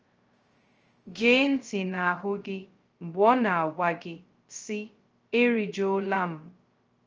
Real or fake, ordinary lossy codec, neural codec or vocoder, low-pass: fake; Opus, 24 kbps; codec, 16 kHz, 0.2 kbps, FocalCodec; 7.2 kHz